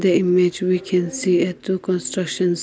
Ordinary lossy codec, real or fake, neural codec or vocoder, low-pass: none; real; none; none